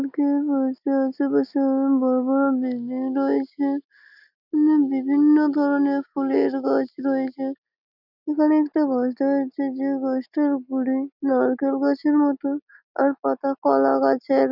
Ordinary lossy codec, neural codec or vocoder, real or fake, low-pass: none; none; real; 5.4 kHz